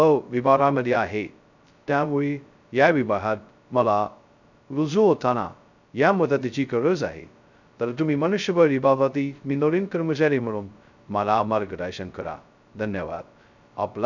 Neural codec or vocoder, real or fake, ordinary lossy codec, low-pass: codec, 16 kHz, 0.2 kbps, FocalCodec; fake; none; 7.2 kHz